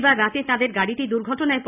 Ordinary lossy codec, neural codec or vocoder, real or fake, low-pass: none; none; real; 3.6 kHz